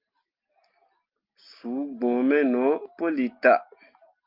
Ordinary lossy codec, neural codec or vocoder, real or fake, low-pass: Opus, 32 kbps; none; real; 5.4 kHz